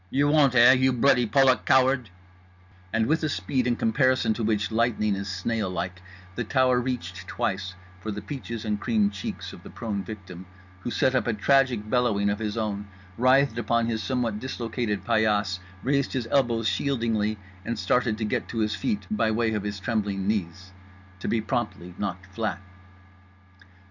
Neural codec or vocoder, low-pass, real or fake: none; 7.2 kHz; real